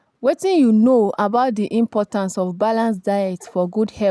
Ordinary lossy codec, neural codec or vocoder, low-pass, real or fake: none; none; none; real